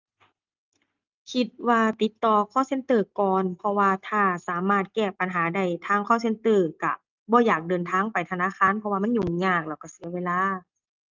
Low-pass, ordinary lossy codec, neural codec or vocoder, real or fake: 7.2 kHz; Opus, 24 kbps; none; real